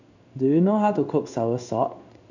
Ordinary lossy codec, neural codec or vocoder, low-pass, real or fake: none; codec, 16 kHz in and 24 kHz out, 1 kbps, XY-Tokenizer; 7.2 kHz; fake